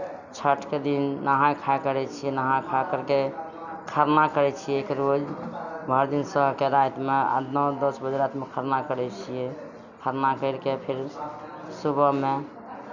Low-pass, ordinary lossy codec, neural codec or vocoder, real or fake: 7.2 kHz; none; none; real